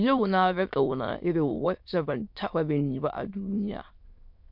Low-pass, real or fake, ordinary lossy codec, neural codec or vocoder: 5.4 kHz; fake; MP3, 48 kbps; autoencoder, 22.05 kHz, a latent of 192 numbers a frame, VITS, trained on many speakers